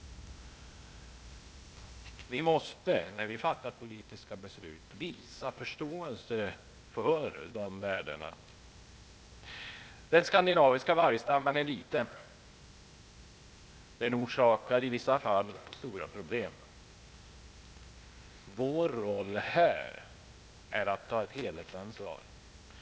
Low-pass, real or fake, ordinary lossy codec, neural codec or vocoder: none; fake; none; codec, 16 kHz, 0.8 kbps, ZipCodec